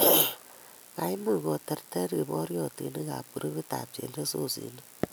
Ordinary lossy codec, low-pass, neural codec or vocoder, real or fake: none; none; none; real